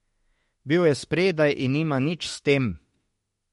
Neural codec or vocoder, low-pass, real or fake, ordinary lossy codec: autoencoder, 48 kHz, 32 numbers a frame, DAC-VAE, trained on Japanese speech; 19.8 kHz; fake; MP3, 48 kbps